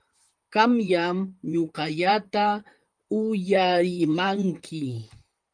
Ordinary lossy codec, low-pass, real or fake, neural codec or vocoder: Opus, 32 kbps; 9.9 kHz; fake; vocoder, 44.1 kHz, 128 mel bands, Pupu-Vocoder